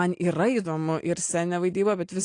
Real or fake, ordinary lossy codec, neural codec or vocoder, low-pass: real; AAC, 48 kbps; none; 9.9 kHz